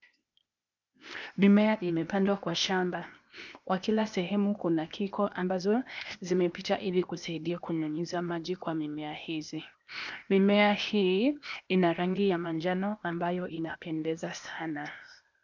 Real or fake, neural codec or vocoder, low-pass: fake; codec, 16 kHz, 0.8 kbps, ZipCodec; 7.2 kHz